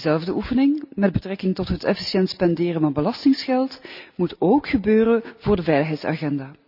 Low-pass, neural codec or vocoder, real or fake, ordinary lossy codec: 5.4 kHz; none; real; none